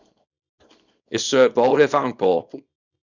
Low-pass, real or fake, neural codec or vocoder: 7.2 kHz; fake; codec, 24 kHz, 0.9 kbps, WavTokenizer, small release